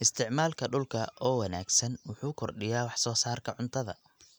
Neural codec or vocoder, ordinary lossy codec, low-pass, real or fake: none; none; none; real